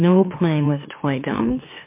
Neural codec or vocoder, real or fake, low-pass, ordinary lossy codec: autoencoder, 44.1 kHz, a latent of 192 numbers a frame, MeloTTS; fake; 3.6 kHz; MP3, 24 kbps